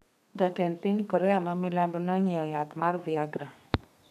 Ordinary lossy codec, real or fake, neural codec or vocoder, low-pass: none; fake; codec, 32 kHz, 1.9 kbps, SNAC; 14.4 kHz